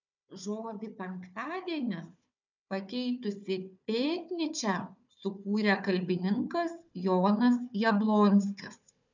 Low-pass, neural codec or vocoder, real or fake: 7.2 kHz; codec, 16 kHz, 4 kbps, FunCodec, trained on Chinese and English, 50 frames a second; fake